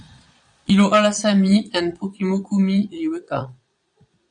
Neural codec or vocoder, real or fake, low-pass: vocoder, 22.05 kHz, 80 mel bands, Vocos; fake; 9.9 kHz